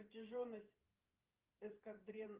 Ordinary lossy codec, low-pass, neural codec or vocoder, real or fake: Opus, 24 kbps; 3.6 kHz; vocoder, 44.1 kHz, 128 mel bands every 512 samples, BigVGAN v2; fake